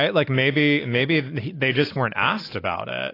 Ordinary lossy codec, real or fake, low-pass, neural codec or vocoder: AAC, 24 kbps; real; 5.4 kHz; none